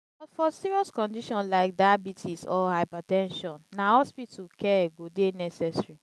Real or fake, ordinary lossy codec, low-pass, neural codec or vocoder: real; none; none; none